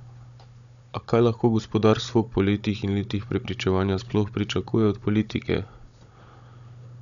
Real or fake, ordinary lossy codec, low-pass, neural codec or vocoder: fake; none; 7.2 kHz; codec, 16 kHz, 16 kbps, FunCodec, trained on Chinese and English, 50 frames a second